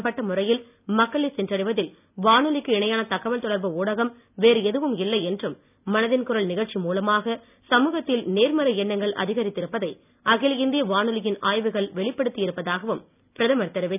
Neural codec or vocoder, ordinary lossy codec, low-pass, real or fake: none; none; 3.6 kHz; real